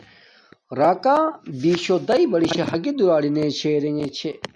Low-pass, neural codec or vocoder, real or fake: 9.9 kHz; none; real